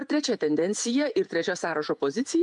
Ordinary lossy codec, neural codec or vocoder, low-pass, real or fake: MP3, 64 kbps; vocoder, 48 kHz, 128 mel bands, Vocos; 10.8 kHz; fake